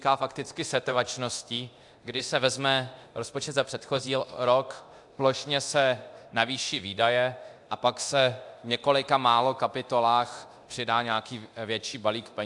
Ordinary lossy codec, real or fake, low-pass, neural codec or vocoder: AAC, 64 kbps; fake; 10.8 kHz; codec, 24 kHz, 0.9 kbps, DualCodec